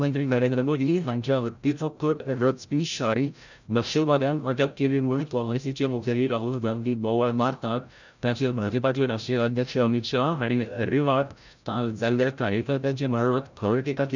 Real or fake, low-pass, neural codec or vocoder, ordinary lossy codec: fake; 7.2 kHz; codec, 16 kHz, 0.5 kbps, FreqCodec, larger model; none